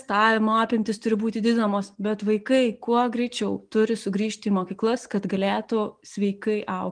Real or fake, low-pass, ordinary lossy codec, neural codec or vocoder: real; 9.9 kHz; Opus, 32 kbps; none